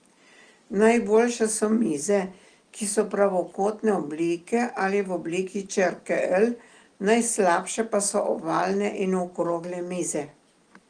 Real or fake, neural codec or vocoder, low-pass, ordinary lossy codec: real; none; 9.9 kHz; Opus, 24 kbps